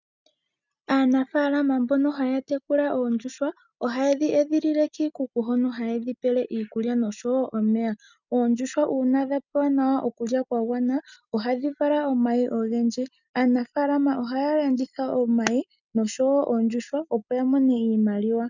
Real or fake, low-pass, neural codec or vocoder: real; 7.2 kHz; none